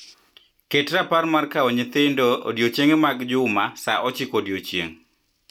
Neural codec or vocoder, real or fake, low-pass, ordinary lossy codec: none; real; 19.8 kHz; none